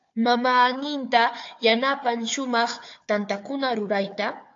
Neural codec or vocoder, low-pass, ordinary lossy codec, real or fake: codec, 16 kHz, 4 kbps, FunCodec, trained on Chinese and English, 50 frames a second; 7.2 kHz; AAC, 48 kbps; fake